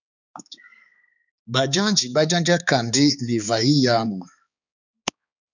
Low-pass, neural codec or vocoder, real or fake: 7.2 kHz; codec, 16 kHz, 4 kbps, X-Codec, HuBERT features, trained on balanced general audio; fake